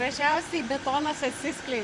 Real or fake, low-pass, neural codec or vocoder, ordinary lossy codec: fake; 10.8 kHz; vocoder, 44.1 kHz, 128 mel bands, Pupu-Vocoder; MP3, 96 kbps